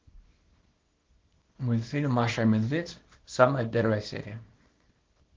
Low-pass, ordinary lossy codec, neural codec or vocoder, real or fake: 7.2 kHz; Opus, 16 kbps; codec, 24 kHz, 0.9 kbps, WavTokenizer, small release; fake